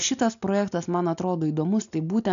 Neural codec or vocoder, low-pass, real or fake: none; 7.2 kHz; real